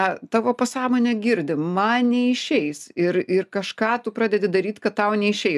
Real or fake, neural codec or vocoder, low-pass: real; none; 14.4 kHz